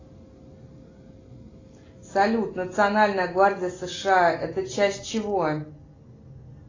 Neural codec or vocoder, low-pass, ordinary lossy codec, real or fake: none; 7.2 kHz; AAC, 32 kbps; real